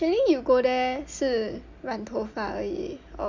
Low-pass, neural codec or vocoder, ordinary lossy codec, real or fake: 7.2 kHz; none; Opus, 64 kbps; real